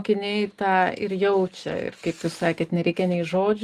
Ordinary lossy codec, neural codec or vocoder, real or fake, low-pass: Opus, 24 kbps; none; real; 14.4 kHz